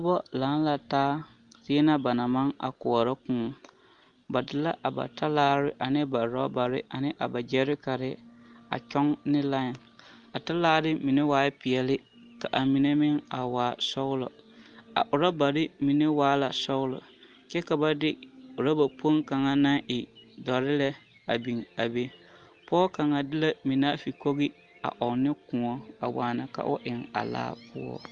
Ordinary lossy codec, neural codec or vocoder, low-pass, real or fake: Opus, 32 kbps; none; 9.9 kHz; real